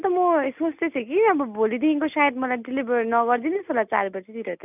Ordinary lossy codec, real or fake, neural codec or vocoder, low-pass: none; real; none; 3.6 kHz